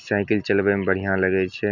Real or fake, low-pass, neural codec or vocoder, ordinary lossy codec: real; 7.2 kHz; none; none